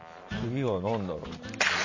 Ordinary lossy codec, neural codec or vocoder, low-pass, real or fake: MP3, 32 kbps; none; 7.2 kHz; real